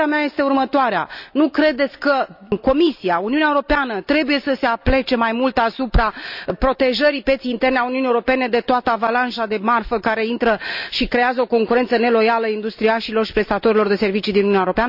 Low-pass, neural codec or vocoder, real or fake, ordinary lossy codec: 5.4 kHz; none; real; none